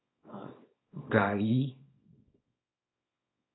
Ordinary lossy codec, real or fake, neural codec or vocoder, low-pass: AAC, 16 kbps; fake; codec, 24 kHz, 0.9 kbps, WavTokenizer, small release; 7.2 kHz